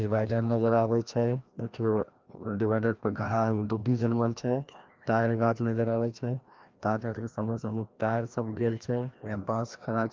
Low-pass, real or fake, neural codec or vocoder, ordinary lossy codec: 7.2 kHz; fake; codec, 16 kHz, 1 kbps, FreqCodec, larger model; Opus, 32 kbps